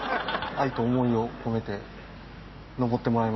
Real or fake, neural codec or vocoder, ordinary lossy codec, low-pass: real; none; MP3, 24 kbps; 7.2 kHz